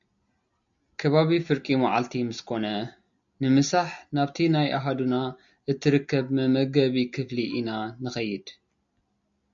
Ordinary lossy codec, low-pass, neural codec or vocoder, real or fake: MP3, 64 kbps; 7.2 kHz; none; real